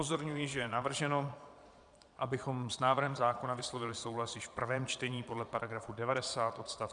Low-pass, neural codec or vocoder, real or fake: 9.9 kHz; vocoder, 22.05 kHz, 80 mel bands, Vocos; fake